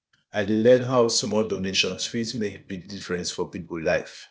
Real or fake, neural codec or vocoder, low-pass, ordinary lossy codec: fake; codec, 16 kHz, 0.8 kbps, ZipCodec; none; none